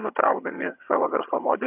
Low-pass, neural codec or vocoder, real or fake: 3.6 kHz; vocoder, 22.05 kHz, 80 mel bands, HiFi-GAN; fake